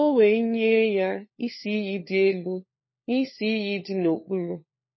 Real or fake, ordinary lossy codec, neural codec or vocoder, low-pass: fake; MP3, 24 kbps; codec, 16 kHz, 4 kbps, FunCodec, trained on LibriTTS, 50 frames a second; 7.2 kHz